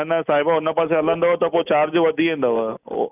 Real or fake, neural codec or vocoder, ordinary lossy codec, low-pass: real; none; none; 3.6 kHz